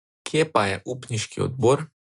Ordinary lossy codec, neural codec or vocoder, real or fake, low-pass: none; none; real; 10.8 kHz